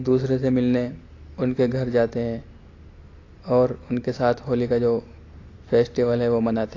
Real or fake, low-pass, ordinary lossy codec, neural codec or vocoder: real; 7.2 kHz; AAC, 32 kbps; none